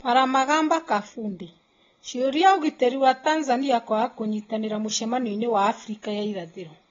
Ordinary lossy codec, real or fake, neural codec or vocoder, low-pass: AAC, 24 kbps; real; none; 7.2 kHz